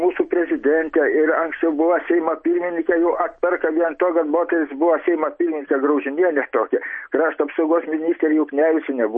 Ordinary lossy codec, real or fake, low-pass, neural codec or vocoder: MP3, 32 kbps; real; 10.8 kHz; none